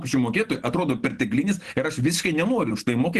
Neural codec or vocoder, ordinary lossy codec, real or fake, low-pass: none; Opus, 16 kbps; real; 14.4 kHz